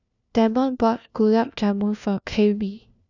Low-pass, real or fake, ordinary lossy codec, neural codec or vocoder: 7.2 kHz; fake; none; codec, 16 kHz, 1 kbps, FunCodec, trained on LibriTTS, 50 frames a second